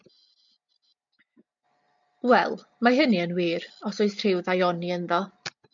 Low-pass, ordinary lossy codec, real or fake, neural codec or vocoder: 7.2 kHz; MP3, 64 kbps; real; none